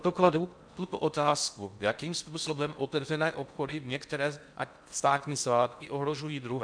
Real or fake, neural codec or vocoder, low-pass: fake; codec, 16 kHz in and 24 kHz out, 0.6 kbps, FocalCodec, streaming, 4096 codes; 9.9 kHz